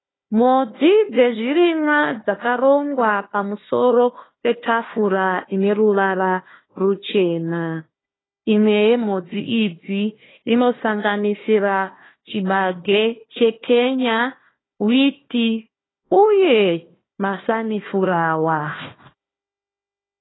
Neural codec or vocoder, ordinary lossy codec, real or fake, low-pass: codec, 16 kHz, 1 kbps, FunCodec, trained on Chinese and English, 50 frames a second; AAC, 16 kbps; fake; 7.2 kHz